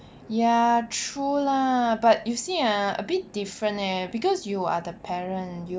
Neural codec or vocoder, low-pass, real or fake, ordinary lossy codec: none; none; real; none